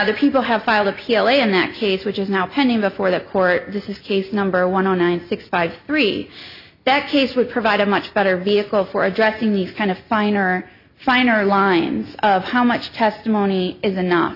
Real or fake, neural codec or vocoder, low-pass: real; none; 5.4 kHz